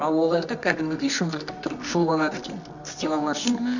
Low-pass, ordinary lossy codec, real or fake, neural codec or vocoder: 7.2 kHz; none; fake; codec, 24 kHz, 0.9 kbps, WavTokenizer, medium music audio release